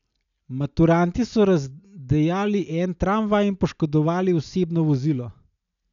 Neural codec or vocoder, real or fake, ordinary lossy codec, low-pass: none; real; none; 7.2 kHz